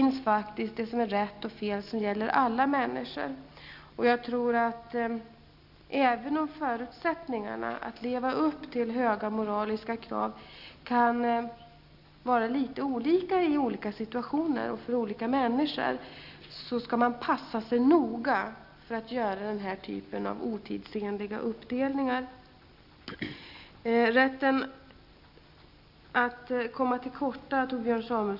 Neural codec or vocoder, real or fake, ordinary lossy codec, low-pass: none; real; none; 5.4 kHz